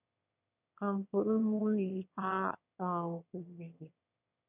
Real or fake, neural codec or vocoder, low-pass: fake; autoencoder, 22.05 kHz, a latent of 192 numbers a frame, VITS, trained on one speaker; 3.6 kHz